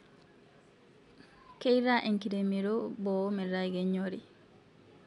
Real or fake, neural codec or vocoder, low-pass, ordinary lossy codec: real; none; 10.8 kHz; none